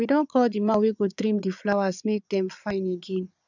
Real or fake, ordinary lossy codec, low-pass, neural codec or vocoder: fake; none; 7.2 kHz; vocoder, 22.05 kHz, 80 mel bands, Vocos